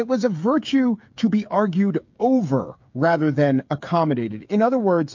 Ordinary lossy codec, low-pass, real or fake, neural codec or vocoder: MP3, 48 kbps; 7.2 kHz; fake; codec, 16 kHz, 8 kbps, FreqCodec, smaller model